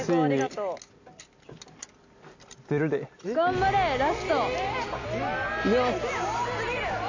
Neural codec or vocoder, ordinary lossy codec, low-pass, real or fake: none; AAC, 48 kbps; 7.2 kHz; real